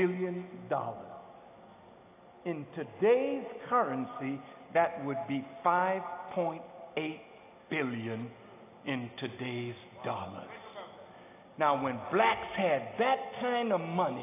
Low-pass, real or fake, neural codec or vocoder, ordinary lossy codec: 3.6 kHz; real; none; AAC, 24 kbps